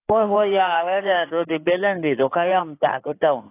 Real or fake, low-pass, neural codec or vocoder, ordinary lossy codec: fake; 3.6 kHz; codec, 16 kHz in and 24 kHz out, 2.2 kbps, FireRedTTS-2 codec; AAC, 24 kbps